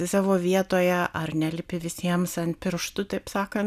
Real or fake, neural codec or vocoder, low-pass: real; none; 14.4 kHz